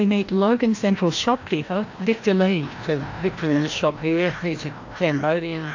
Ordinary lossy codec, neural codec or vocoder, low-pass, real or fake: AAC, 48 kbps; codec, 16 kHz, 1 kbps, FreqCodec, larger model; 7.2 kHz; fake